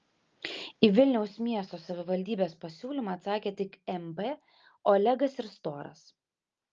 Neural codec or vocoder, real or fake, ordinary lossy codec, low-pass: none; real; Opus, 24 kbps; 7.2 kHz